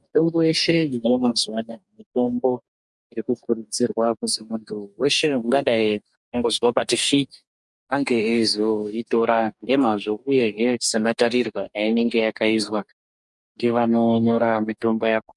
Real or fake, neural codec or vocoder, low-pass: fake; codec, 44.1 kHz, 2.6 kbps, DAC; 10.8 kHz